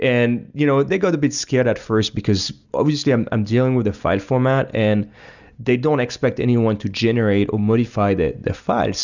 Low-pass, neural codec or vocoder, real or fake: 7.2 kHz; none; real